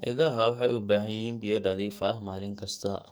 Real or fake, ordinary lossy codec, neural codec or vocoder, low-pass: fake; none; codec, 44.1 kHz, 2.6 kbps, SNAC; none